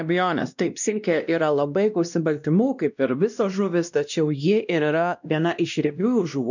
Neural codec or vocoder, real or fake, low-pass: codec, 16 kHz, 1 kbps, X-Codec, WavLM features, trained on Multilingual LibriSpeech; fake; 7.2 kHz